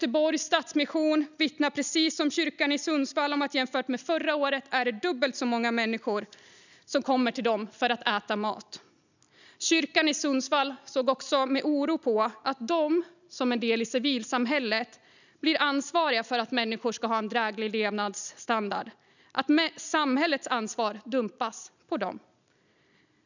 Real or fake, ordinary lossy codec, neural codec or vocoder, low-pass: real; none; none; 7.2 kHz